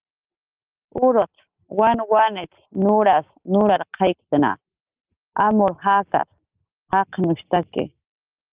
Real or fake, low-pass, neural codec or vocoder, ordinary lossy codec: real; 3.6 kHz; none; Opus, 24 kbps